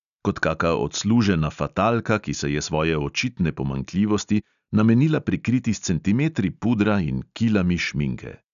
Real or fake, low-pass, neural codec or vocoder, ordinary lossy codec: real; 7.2 kHz; none; none